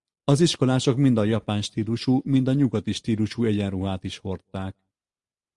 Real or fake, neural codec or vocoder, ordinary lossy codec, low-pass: real; none; Opus, 64 kbps; 10.8 kHz